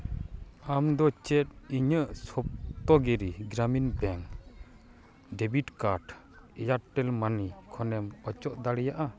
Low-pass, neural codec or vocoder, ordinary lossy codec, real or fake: none; none; none; real